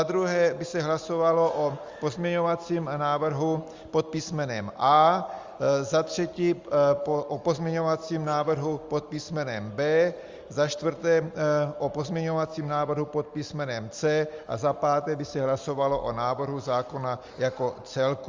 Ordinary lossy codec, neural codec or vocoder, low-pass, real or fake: Opus, 32 kbps; none; 7.2 kHz; real